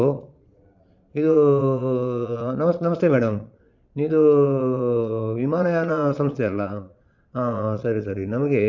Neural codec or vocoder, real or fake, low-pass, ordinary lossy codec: vocoder, 22.05 kHz, 80 mel bands, Vocos; fake; 7.2 kHz; none